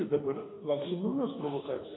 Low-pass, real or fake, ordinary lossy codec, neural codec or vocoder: 7.2 kHz; fake; AAC, 16 kbps; autoencoder, 48 kHz, 32 numbers a frame, DAC-VAE, trained on Japanese speech